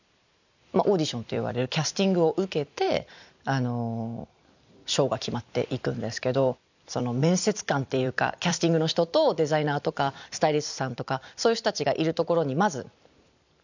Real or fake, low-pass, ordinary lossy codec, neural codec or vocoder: fake; 7.2 kHz; none; vocoder, 44.1 kHz, 128 mel bands every 256 samples, BigVGAN v2